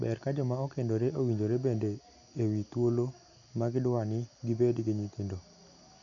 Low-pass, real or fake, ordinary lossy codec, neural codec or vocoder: 7.2 kHz; real; none; none